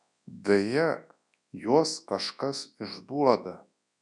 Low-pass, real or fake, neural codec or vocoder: 10.8 kHz; fake; codec, 24 kHz, 0.9 kbps, WavTokenizer, large speech release